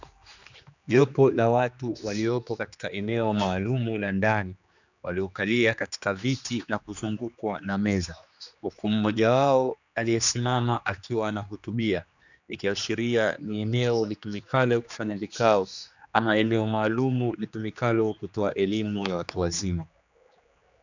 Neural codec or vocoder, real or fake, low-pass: codec, 16 kHz, 2 kbps, X-Codec, HuBERT features, trained on general audio; fake; 7.2 kHz